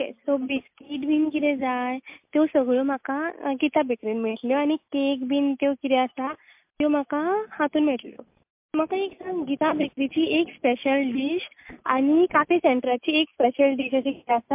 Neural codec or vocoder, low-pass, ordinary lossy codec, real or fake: none; 3.6 kHz; MP3, 32 kbps; real